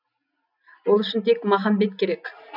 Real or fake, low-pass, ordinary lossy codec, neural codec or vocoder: real; 5.4 kHz; none; none